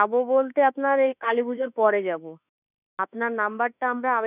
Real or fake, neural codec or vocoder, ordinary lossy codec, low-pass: fake; autoencoder, 48 kHz, 32 numbers a frame, DAC-VAE, trained on Japanese speech; none; 3.6 kHz